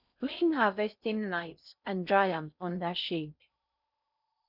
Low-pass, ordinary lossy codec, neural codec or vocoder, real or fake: 5.4 kHz; none; codec, 16 kHz in and 24 kHz out, 0.6 kbps, FocalCodec, streaming, 4096 codes; fake